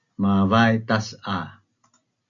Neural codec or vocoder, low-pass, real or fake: none; 7.2 kHz; real